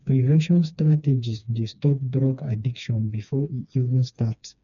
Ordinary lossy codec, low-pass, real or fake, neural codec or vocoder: none; 7.2 kHz; fake; codec, 16 kHz, 2 kbps, FreqCodec, smaller model